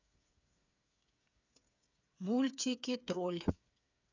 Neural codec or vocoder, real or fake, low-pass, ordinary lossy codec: codec, 16 kHz, 8 kbps, FreqCodec, smaller model; fake; 7.2 kHz; none